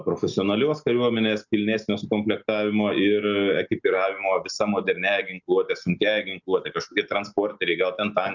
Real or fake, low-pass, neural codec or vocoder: real; 7.2 kHz; none